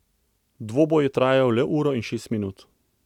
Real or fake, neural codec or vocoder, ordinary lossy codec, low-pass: real; none; none; 19.8 kHz